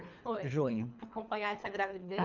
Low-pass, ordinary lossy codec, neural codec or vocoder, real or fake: 7.2 kHz; none; codec, 24 kHz, 3 kbps, HILCodec; fake